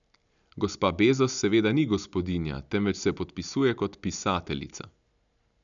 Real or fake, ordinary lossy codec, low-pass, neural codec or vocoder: real; none; 7.2 kHz; none